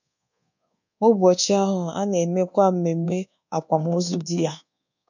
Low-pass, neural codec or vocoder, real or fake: 7.2 kHz; codec, 24 kHz, 1.2 kbps, DualCodec; fake